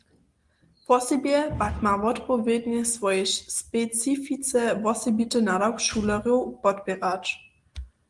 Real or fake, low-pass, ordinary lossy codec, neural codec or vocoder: real; 10.8 kHz; Opus, 24 kbps; none